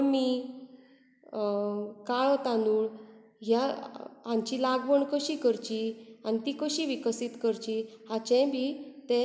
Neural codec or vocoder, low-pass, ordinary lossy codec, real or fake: none; none; none; real